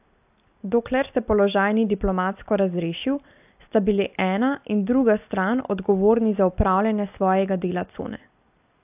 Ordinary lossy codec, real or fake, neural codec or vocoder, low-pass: AAC, 32 kbps; real; none; 3.6 kHz